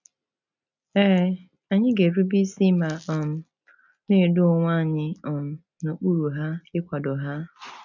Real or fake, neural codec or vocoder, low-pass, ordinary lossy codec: real; none; 7.2 kHz; none